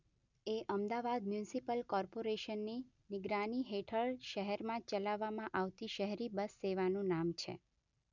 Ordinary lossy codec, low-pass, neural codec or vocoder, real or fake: none; 7.2 kHz; none; real